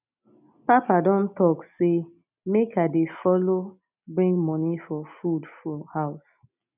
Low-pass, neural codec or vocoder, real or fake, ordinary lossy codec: 3.6 kHz; none; real; none